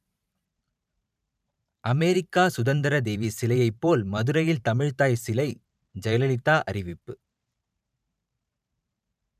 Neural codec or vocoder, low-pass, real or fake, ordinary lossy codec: vocoder, 44.1 kHz, 128 mel bands every 512 samples, BigVGAN v2; 14.4 kHz; fake; none